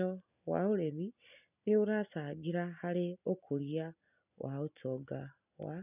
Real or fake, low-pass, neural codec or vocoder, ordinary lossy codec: real; 3.6 kHz; none; none